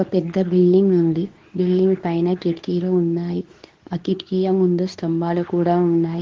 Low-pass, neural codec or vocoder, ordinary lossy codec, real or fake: 7.2 kHz; codec, 24 kHz, 0.9 kbps, WavTokenizer, medium speech release version 2; Opus, 16 kbps; fake